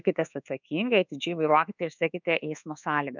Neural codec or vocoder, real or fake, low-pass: codec, 24 kHz, 1.2 kbps, DualCodec; fake; 7.2 kHz